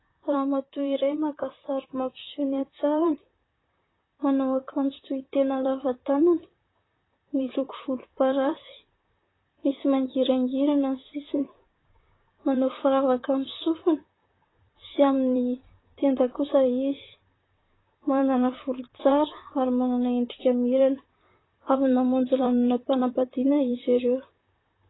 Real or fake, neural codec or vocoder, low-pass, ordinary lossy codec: fake; vocoder, 44.1 kHz, 128 mel bands, Pupu-Vocoder; 7.2 kHz; AAC, 16 kbps